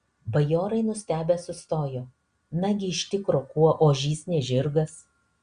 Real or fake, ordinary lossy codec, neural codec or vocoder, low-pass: real; Opus, 64 kbps; none; 9.9 kHz